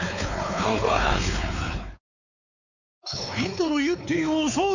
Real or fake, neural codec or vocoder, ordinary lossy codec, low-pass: fake; codec, 16 kHz, 4 kbps, X-Codec, WavLM features, trained on Multilingual LibriSpeech; none; 7.2 kHz